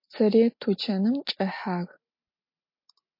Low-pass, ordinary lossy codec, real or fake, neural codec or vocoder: 5.4 kHz; MP3, 32 kbps; real; none